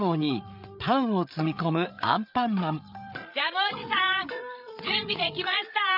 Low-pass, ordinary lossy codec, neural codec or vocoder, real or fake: 5.4 kHz; none; codec, 16 kHz, 8 kbps, FreqCodec, larger model; fake